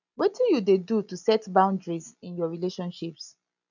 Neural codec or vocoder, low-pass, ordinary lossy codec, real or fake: none; 7.2 kHz; none; real